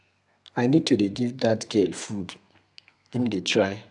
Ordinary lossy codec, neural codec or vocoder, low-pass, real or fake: none; codec, 32 kHz, 1.9 kbps, SNAC; 10.8 kHz; fake